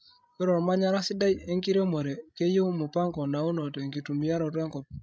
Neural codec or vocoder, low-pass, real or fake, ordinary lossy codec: codec, 16 kHz, 16 kbps, FreqCodec, larger model; none; fake; none